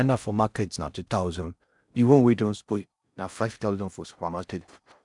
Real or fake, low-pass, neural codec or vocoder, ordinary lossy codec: fake; 10.8 kHz; codec, 16 kHz in and 24 kHz out, 0.6 kbps, FocalCodec, streaming, 4096 codes; none